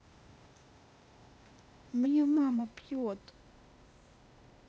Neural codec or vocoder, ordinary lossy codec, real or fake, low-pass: codec, 16 kHz, 0.8 kbps, ZipCodec; none; fake; none